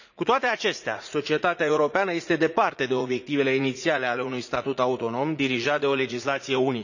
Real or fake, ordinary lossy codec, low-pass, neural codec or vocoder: fake; none; 7.2 kHz; vocoder, 44.1 kHz, 80 mel bands, Vocos